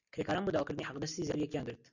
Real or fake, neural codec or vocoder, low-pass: real; none; 7.2 kHz